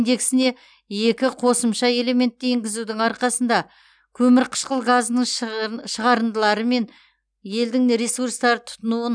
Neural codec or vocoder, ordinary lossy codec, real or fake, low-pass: none; none; real; 9.9 kHz